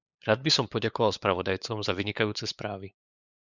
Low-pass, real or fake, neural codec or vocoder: 7.2 kHz; fake; codec, 16 kHz, 8 kbps, FunCodec, trained on LibriTTS, 25 frames a second